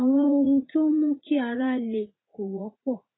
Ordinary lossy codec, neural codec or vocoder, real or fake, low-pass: AAC, 16 kbps; vocoder, 44.1 kHz, 80 mel bands, Vocos; fake; 7.2 kHz